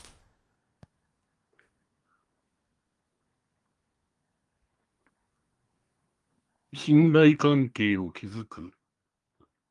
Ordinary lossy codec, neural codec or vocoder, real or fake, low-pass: Opus, 32 kbps; codec, 24 kHz, 1 kbps, SNAC; fake; 10.8 kHz